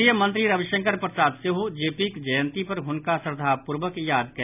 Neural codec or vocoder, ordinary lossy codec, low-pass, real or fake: none; none; 3.6 kHz; real